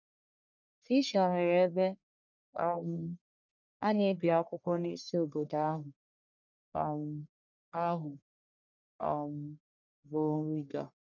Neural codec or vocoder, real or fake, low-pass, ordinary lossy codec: codec, 44.1 kHz, 1.7 kbps, Pupu-Codec; fake; 7.2 kHz; none